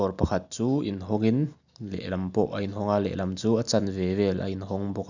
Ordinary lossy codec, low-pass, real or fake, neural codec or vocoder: none; 7.2 kHz; real; none